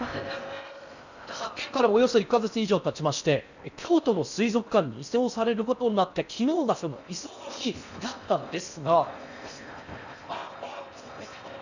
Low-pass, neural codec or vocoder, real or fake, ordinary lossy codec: 7.2 kHz; codec, 16 kHz in and 24 kHz out, 0.6 kbps, FocalCodec, streaming, 4096 codes; fake; none